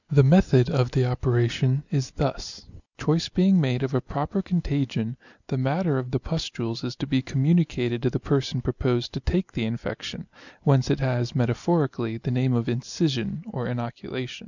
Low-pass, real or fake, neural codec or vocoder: 7.2 kHz; real; none